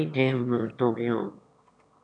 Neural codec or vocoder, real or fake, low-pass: autoencoder, 22.05 kHz, a latent of 192 numbers a frame, VITS, trained on one speaker; fake; 9.9 kHz